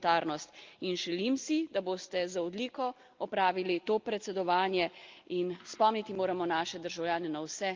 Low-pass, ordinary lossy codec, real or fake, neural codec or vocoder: 7.2 kHz; Opus, 32 kbps; real; none